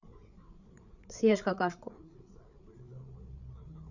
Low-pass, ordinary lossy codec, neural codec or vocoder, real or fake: 7.2 kHz; none; codec, 16 kHz, 8 kbps, FreqCodec, larger model; fake